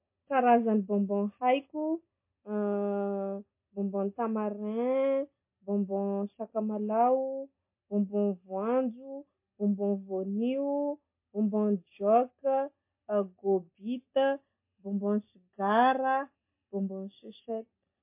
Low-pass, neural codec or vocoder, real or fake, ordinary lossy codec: 3.6 kHz; none; real; MP3, 24 kbps